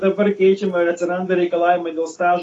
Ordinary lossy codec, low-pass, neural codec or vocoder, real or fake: AAC, 32 kbps; 10.8 kHz; none; real